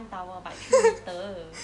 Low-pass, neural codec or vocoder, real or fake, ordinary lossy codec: 10.8 kHz; none; real; none